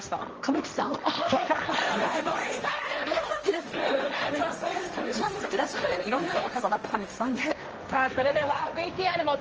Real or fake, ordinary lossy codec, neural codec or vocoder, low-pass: fake; Opus, 24 kbps; codec, 16 kHz, 1.1 kbps, Voila-Tokenizer; 7.2 kHz